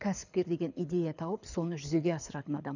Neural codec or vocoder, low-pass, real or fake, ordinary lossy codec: codec, 24 kHz, 6 kbps, HILCodec; 7.2 kHz; fake; none